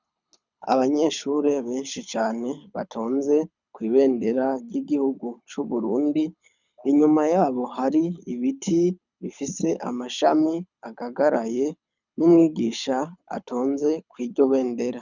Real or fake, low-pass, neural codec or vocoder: fake; 7.2 kHz; codec, 24 kHz, 6 kbps, HILCodec